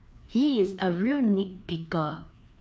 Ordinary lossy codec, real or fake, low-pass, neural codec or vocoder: none; fake; none; codec, 16 kHz, 2 kbps, FreqCodec, larger model